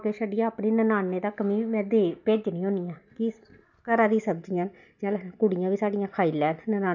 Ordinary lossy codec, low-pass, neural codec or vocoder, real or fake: none; 7.2 kHz; none; real